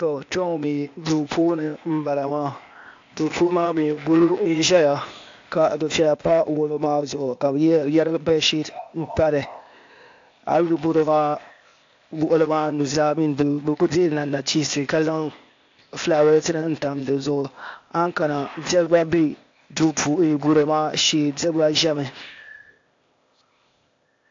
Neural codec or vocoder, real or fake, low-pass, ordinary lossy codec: codec, 16 kHz, 0.8 kbps, ZipCodec; fake; 7.2 kHz; AAC, 64 kbps